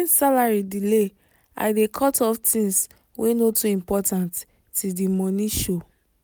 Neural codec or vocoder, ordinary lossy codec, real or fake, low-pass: none; none; real; none